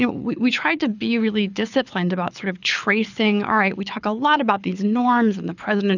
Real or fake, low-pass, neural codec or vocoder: fake; 7.2 kHz; codec, 24 kHz, 6 kbps, HILCodec